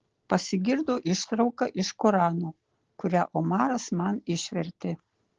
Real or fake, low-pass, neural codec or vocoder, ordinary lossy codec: real; 7.2 kHz; none; Opus, 16 kbps